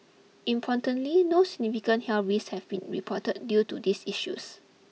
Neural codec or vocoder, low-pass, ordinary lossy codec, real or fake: none; none; none; real